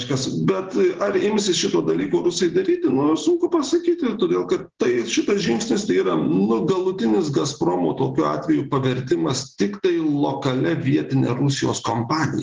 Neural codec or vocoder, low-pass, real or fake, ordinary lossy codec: none; 7.2 kHz; real; Opus, 16 kbps